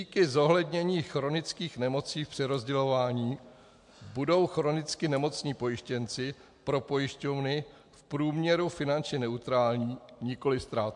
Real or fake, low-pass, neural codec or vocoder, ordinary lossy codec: real; 10.8 kHz; none; MP3, 64 kbps